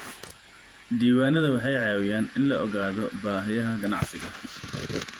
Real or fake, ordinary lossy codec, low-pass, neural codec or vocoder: fake; Opus, 32 kbps; 19.8 kHz; vocoder, 48 kHz, 128 mel bands, Vocos